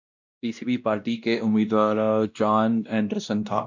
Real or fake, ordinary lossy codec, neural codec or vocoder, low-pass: fake; MP3, 64 kbps; codec, 16 kHz, 1 kbps, X-Codec, WavLM features, trained on Multilingual LibriSpeech; 7.2 kHz